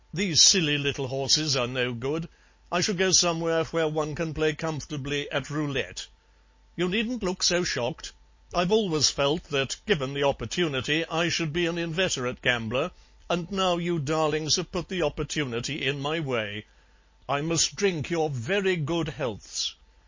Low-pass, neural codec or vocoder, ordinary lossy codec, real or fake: 7.2 kHz; none; MP3, 32 kbps; real